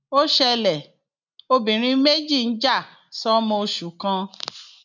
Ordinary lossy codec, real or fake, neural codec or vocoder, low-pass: none; real; none; 7.2 kHz